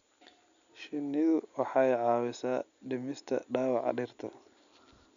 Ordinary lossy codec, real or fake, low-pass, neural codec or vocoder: MP3, 96 kbps; real; 7.2 kHz; none